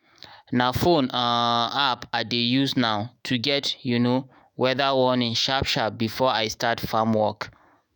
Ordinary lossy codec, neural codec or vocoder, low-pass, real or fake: none; autoencoder, 48 kHz, 128 numbers a frame, DAC-VAE, trained on Japanese speech; none; fake